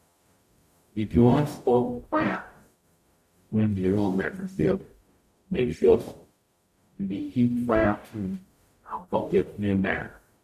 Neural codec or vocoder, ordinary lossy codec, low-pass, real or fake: codec, 44.1 kHz, 0.9 kbps, DAC; none; 14.4 kHz; fake